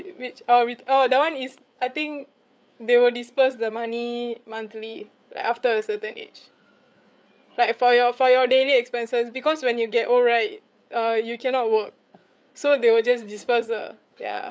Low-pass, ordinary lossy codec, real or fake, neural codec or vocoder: none; none; fake; codec, 16 kHz, 8 kbps, FreqCodec, larger model